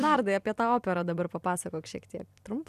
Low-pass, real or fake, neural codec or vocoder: 14.4 kHz; fake; vocoder, 44.1 kHz, 128 mel bands every 256 samples, BigVGAN v2